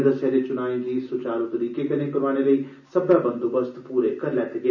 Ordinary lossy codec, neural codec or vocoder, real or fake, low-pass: none; none; real; 7.2 kHz